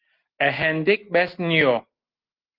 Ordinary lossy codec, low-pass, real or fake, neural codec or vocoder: Opus, 16 kbps; 5.4 kHz; real; none